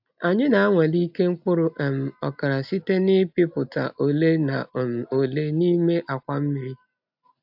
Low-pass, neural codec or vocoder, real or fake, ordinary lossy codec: 5.4 kHz; none; real; AAC, 48 kbps